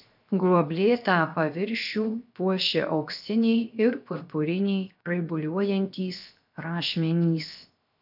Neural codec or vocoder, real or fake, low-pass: codec, 16 kHz, 0.7 kbps, FocalCodec; fake; 5.4 kHz